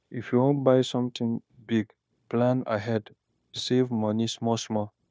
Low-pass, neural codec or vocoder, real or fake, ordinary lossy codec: none; codec, 16 kHz, 0.9 kbps, LongCat-Audio-Codec; fake; none